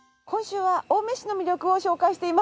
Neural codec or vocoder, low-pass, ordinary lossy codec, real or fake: none; none; none; real